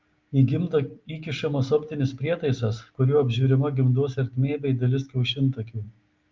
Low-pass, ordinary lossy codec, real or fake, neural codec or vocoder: 7.2 kHz; Opus, 32 kbps; real; none